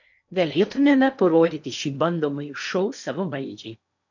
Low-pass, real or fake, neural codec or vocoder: 7.2 kHz; fake; codec, 16 kHz in and 24 kHz out, 0.8 kbps, FocalCodec, streaming, 65536 codes